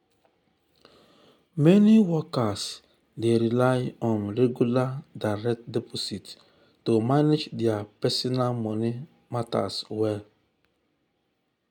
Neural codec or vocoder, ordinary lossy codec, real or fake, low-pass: none; none; real; 19.8 kHz